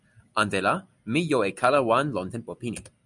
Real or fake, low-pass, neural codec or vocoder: real; 10.8 kHz; none